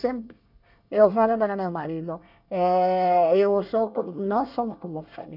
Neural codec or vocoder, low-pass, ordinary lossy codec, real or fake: codec, 24 kHz, 1 kbps, SNAC; 5.4 kHz; none; fake